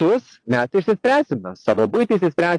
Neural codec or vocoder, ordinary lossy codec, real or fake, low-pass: vocoder, 22.05 kHz, 80 mel bands, Vocos; AAC, 48 kbps; fake; 9.9 kHz